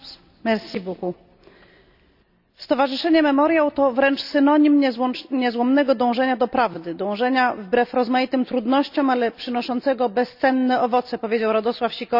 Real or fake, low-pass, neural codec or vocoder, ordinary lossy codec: real; 5.4 kHz; none; none